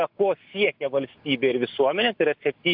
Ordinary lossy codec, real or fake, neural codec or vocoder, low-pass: AAC, 48 kbps; real; none; 5.4 kHz